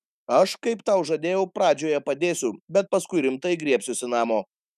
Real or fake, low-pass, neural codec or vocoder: fake; 14.4 kHz; autoencoder, 48 kHz, 128 numbers a frame, DAC-VAE, trained on Japanese speech